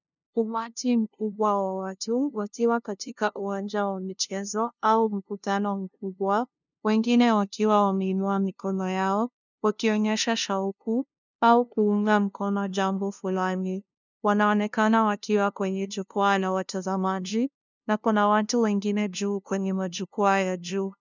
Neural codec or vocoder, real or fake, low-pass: codec, 16 kHz, 0.5 kbps, FunCodec, trained on LibriTTS, 25 frames a second; fake; 7.2 kHz